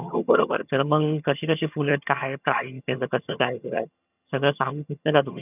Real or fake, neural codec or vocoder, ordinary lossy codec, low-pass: fake; vocoder, 22.05 kHz, 80 mel bands, HiFi-GAN; none; 3.6 kHz